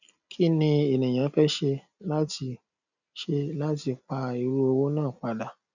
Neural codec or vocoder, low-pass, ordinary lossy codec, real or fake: none; 7.2 kHz; none; real